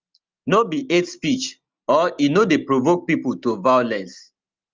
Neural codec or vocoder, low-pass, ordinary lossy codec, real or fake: none; 7.2 kHz; Opus, 32 kbps; real